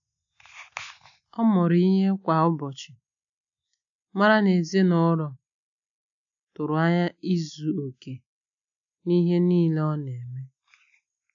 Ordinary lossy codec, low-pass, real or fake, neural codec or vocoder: none; 7.2 kHz; real; none